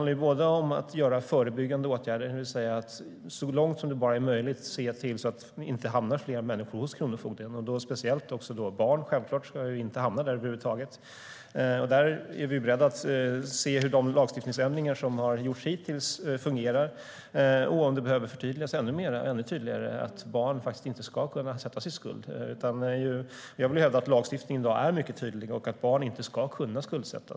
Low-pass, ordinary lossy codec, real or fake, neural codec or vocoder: none; none; real; none